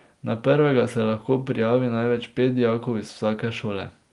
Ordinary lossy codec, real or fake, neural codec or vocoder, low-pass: Opus, 24 kbps; real; none; 10.8 kHz